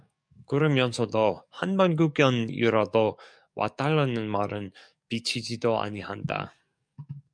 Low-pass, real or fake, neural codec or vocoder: 9.9 kHz; fake; codec, 44.1 kHz, 7.8 kbps, DAC